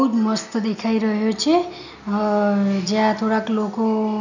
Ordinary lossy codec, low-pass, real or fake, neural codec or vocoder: none; 7.2 kHz; real; none